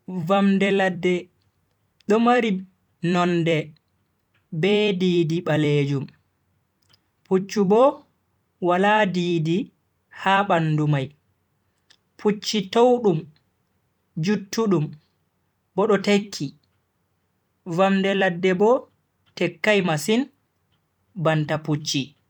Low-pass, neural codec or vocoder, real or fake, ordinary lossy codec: 19.8 kHz; vocoder, 44.1 kHz, 128 mel bands every 256 samples, BigVGAN v2; fake; none